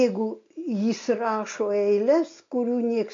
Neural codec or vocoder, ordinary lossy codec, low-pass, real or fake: none; AAC, 48 kbps; 7.2 kHz; real